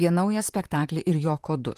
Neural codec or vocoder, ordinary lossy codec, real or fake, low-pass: vocoder, 44.1 kHz, 128 mel bands, Pupu-Vocoder; Opus, 24 kbps; fake; 14.4 kHz